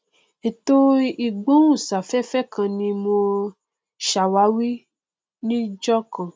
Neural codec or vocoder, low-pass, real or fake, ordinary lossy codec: none; none; real; none